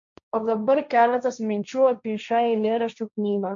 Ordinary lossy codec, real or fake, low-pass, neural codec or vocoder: AAC, 64 kbps; fake; 7.2 kHz; codec, 16 kHz, 1.1 kbps, Voila-Tokenizer